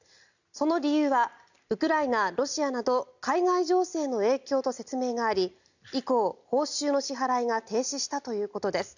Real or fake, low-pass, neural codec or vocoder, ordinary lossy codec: real; 7.2 kHz; none; none